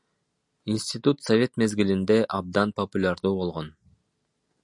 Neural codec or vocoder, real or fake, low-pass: none; real; 10.8 kHz